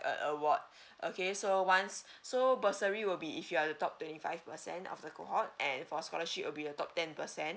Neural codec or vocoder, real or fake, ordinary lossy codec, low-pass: none; real; none; none